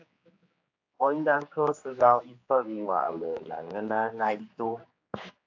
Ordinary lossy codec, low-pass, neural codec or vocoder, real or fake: AAC, 48 kbps; 7.2 kHz; codec, 16 kHz, 2 kbps, X-Codec, HuBERT features, trained on general audio; fake